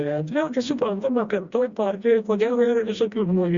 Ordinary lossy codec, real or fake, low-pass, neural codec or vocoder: Opus, 64 kbps; fake; 7.2 kHz; codec, 16 kHz, 1 kbps, FreqCodec, smaller model